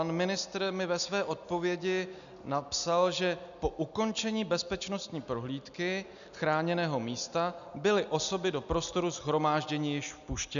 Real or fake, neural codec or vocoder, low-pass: real; none; 7.2 kHz